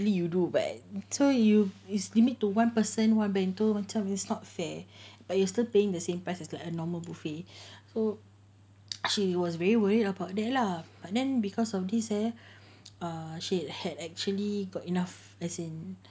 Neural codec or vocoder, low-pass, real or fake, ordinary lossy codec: none; none; real; none